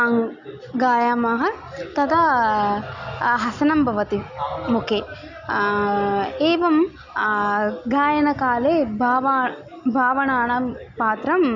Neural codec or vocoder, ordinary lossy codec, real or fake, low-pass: none; none; real; 7.2 kHz